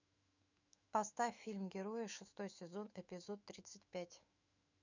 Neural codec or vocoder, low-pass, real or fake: autoencoder, 48 kHz, 128 numbers a frame, DAC-VAE, trained on Japanese speech; 7.2 kHz; fake